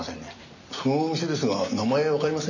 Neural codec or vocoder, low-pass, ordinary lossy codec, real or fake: none; 7.2 kHz; none; real